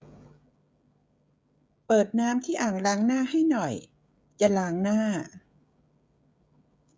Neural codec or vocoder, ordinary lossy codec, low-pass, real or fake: codec, 16 kHz, 16 kbps, FreqCodec, smaller model; none; none; fake